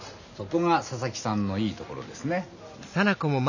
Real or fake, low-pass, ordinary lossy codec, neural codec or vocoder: real; 7.2 kHz; none; none